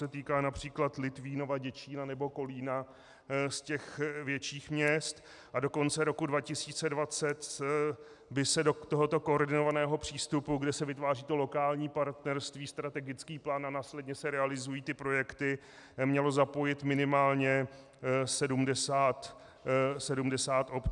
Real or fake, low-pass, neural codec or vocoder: real; 10.8 kHz; none